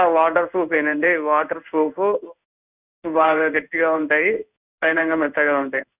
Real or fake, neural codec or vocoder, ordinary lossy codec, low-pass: fake; codec, 16 kHz in and 24 kHz out, 1 kbps, XY-Tokenizer; none; 3.6 kHz